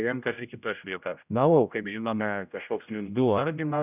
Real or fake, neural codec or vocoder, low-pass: fake; codec, 16 kHz, 0.5 kbps, X-Codec, HuBERT features, trained on general audio; 3.6 kHz